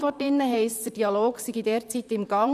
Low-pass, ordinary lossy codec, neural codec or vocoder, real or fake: 14.4 kHz; none; vocoder, 44.1 kHz, 128 mel bands, Pupu-Vocoder; fake